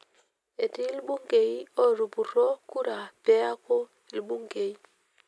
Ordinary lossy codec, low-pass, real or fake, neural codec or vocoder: none; none; real; none